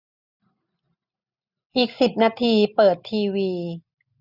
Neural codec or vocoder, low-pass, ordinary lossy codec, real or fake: none; 5.4 kHz; none; real